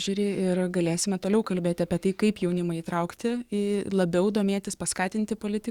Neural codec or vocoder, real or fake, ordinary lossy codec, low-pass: codec, 44.1 kHz, 7.8 kbps, DAC; fake; Opus, 64 kbps; 19.8 kHz